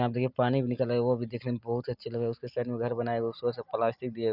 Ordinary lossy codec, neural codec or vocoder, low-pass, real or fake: none; none; 5.4 kHz; real